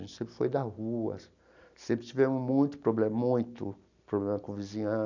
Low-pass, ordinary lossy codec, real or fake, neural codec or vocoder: 7.2 kHz; none; real; none